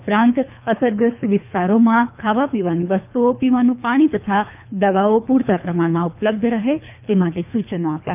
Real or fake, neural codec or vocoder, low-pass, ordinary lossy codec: fake; codec, 24 kHz, 3 kbps, HILCodec; 3.6 kHz; none